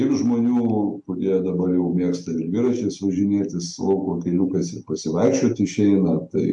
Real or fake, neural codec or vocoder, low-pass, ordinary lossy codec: real; none; 10.8 kHz; MP3, 64 kbps